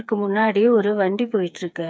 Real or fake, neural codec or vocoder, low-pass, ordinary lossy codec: fake; codec, 16 kHz, 4 kbps, FreqCodec, smaller model; none; none